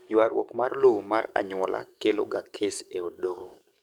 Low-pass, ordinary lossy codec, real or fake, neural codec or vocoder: 19.8 kHz; none; fake; codec, 44.1 kHz, 7.8 kbps, DAC